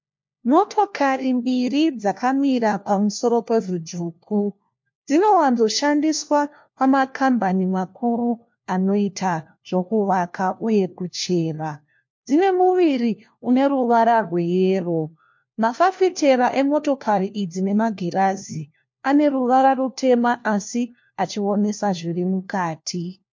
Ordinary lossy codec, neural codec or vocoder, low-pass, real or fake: MP3, 48 kbps; codec, 16 kHz, 1 kbps, FunCodec, trained on LibriTTS, 50 frames a second; 7.2 kHz; fake